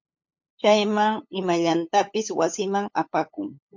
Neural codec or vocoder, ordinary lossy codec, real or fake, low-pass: codec, 16 kHz, 8 kbps, FunCodec, trained on LibriTTS, 25 frames a second; MP3, 48 kbps; fake; 7.2 kHz